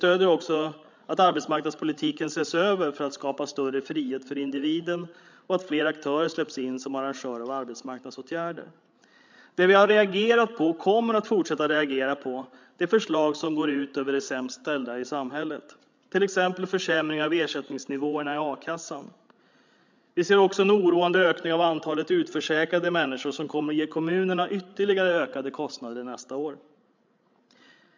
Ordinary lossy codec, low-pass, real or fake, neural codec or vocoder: MP3, 64 kbps; 7.2 kHz; fake; codec, 16 kHz, 16 kbps, FreqCodec, larger model